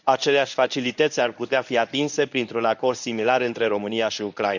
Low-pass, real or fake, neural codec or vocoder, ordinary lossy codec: 7.2 kHz; fake; codec, 24 kHz, 0.9 kbps, WavTokenizer, medium speech release version 1; none